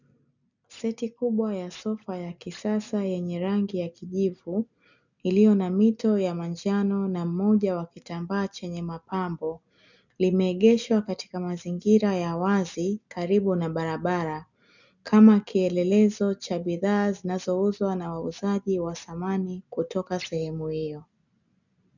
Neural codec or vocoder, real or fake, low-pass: none; real; 7.2 kHz